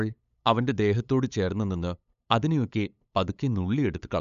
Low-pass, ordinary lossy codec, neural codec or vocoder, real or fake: 7.2 kHz; none; codec, 16 kHz, 8 kbps, FunCodec, trained on LibriTTS, 25 frames a second; fake